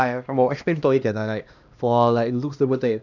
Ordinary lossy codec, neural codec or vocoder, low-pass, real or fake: none; codec, 16 kHz, 1 kbps, X-Codec, HuBERT features, trained on LibriSpeech; 7.2 kHz; fake